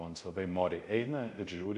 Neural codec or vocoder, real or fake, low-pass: codec, 24 kHz, 0.5 kbps, DualCodec; fake; 10.8 kHz